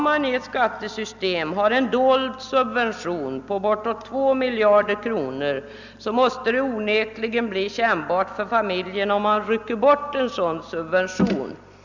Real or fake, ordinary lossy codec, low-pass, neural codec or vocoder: real; none; 7.2 kHz; none